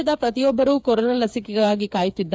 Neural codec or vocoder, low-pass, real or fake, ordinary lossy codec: codec, 16 kHz, 8 kbps, FreqCodec, smaller model; none; fake; none